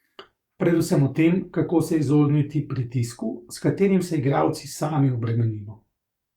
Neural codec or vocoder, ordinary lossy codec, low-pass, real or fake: codec, 44.1 kHz, 7.8 kbps, DAC; Opus, 64 kbps; 19.8 kHz; fake